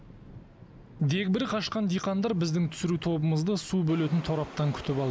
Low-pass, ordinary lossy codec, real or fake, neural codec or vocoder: none; none; real; none